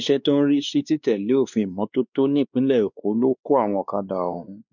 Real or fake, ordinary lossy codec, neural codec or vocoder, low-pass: fake; none; codec, 16 kHz, 2 kbps, X-Codec, WavLM features, trained on Multilingual LibriSpeech; 7.2 kHz